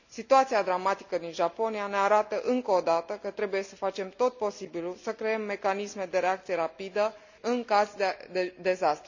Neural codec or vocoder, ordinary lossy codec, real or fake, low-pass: none; none; real; 7.2 kHz